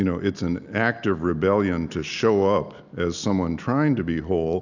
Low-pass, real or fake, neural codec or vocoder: 7.2 kHz; real; none